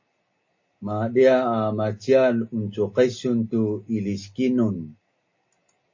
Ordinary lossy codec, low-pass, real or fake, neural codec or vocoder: MP3, 32 kbps; 7.2 kHz; real; none